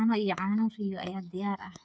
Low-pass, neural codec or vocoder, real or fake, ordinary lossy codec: none; codec, 16 kHz, 8 kbps, FreqCodec, smaller model; fake; none